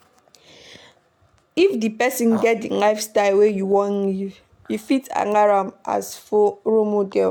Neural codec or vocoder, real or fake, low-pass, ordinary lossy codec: none; real; 19.8 kHz; none